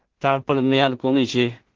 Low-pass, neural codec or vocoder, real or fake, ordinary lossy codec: 7.2 kHz; codec, 16 kHz in and 24 kHz out, 0.4 kbps, LongCat-Audio-Codec, two codebook decoder; fake; Opus, 16 kbps